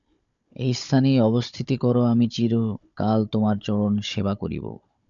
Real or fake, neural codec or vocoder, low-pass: fake; codec, 16 kHz, 16 kbps, FunCodec, trained on Chinese and English, 50 frames a second; 7.2 kHz